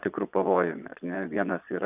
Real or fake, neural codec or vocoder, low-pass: fake; vocoder, 22.05 kHz, 80 mel bands, Vocos; 3.6 kHz